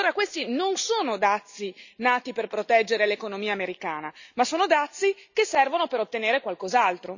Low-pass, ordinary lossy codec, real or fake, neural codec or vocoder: 7.2 kHz; none; real; none